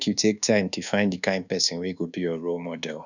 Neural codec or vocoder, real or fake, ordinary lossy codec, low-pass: codec, 24 kHz, 1.2 kbps, DualCodec; fake; none; 7.2 kHz